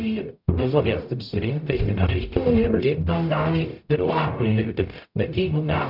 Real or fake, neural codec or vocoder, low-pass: fake; codec, 44.1 kHz, 0.9 kbps, DAC; 5.4 kHz